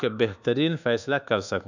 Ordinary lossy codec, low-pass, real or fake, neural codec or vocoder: none; 7.2 kHz; fake; codec, 24 kHz, 1.2 kbps, DualCodec